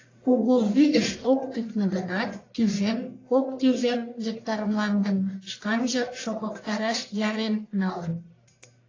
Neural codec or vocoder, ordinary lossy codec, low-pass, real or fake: codec, 44.1 kHz, 1.7 kbps, Pupu-Codec; AAC, 32 kbps; 7.2 kHz; fake